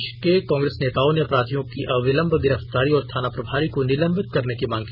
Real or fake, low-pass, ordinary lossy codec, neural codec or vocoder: real; 5.4 kHz; none; none